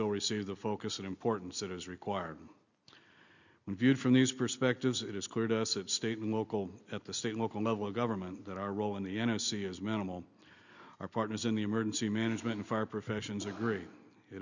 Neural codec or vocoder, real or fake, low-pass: none; real; 7.2 kHz